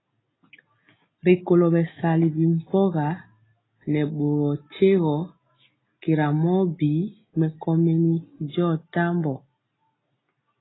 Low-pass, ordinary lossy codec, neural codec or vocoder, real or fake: 7.2 kHz; AAC, 16 kbps; none; real